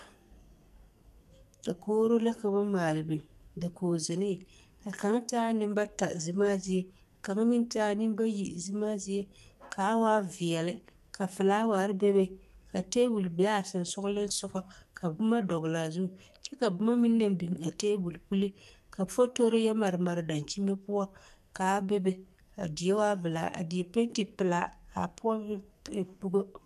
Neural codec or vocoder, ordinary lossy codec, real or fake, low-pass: codec, 44.1 kHz, 2.6 kbps, SNAC; MP3, 96 kbps; fake; 14.4 kHz